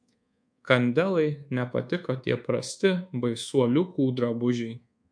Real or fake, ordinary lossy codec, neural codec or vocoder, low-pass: fake; MP3, 64 kbps; codec, 24 kHz, 1.2 kbps, DualCodec; 9.9 kHz